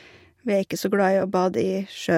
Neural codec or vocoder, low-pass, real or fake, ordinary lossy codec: none; 19.8 kHz; real; MP3, 64 kbps